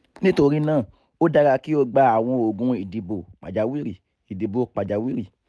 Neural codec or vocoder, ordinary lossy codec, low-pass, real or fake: none; none; none; real